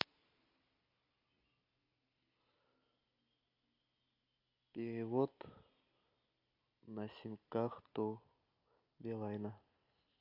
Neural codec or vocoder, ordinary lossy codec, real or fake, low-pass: none; MP3, 48 kbps; real; 5.4 kHz